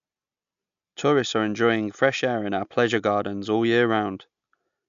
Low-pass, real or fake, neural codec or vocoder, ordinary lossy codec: 7.2 kHz; real; none; AAC, 96 kbps